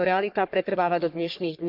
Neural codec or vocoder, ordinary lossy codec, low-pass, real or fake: codec, 44.1 kHz, 3.4 kbps, Pupu-Codec; none; 5.4 kHz; fake